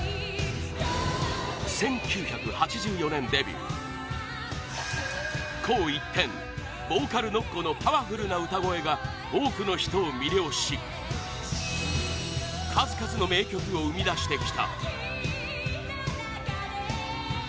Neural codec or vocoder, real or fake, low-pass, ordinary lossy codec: none; real; none; none